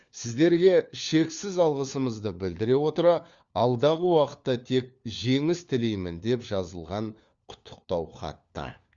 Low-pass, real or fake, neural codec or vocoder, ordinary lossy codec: 7.2 kHz; fake; codec, 16 kHz, 4 kbps, FunCodec, trained on LibriTTS, 50 frames a second; Opus, 64 kbps